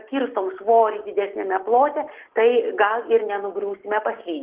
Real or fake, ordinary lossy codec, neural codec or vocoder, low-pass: real; Opus, 16 kbps; none; 3.6 kHz